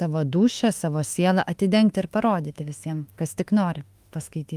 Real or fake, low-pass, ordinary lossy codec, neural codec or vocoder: fake; 14.4 kHz; Opus, 32 kbps; autoencoder, 48 kHz, 32 numbers a frame, DAC-VAE, trained on Japanese speech